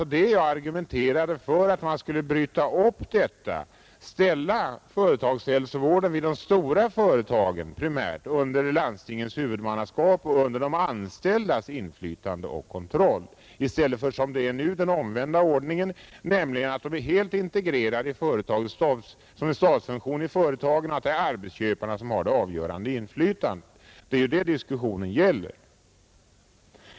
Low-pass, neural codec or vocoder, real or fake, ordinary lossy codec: none; none; real; none